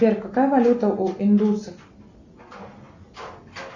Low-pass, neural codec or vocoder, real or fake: 7.2 kHz; none; real